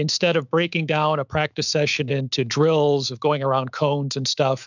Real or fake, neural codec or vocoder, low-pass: fake; autoencoder, 48 kHz, 128 numbers a frame, DAC-VAE, trained on Japanese speech; 7.2 kHz